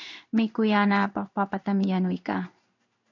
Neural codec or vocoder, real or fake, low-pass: codec, 16 kHz in and 24 kHz out, 1 kbps, XY-Tokenizer; fake; 7.2 kHz